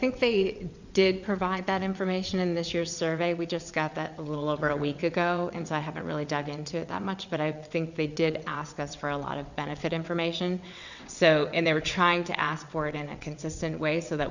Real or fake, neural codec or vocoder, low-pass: fake; vocoder, 22.05 kHz, 80 mel bands, WaveNeXt; 7.2 kHz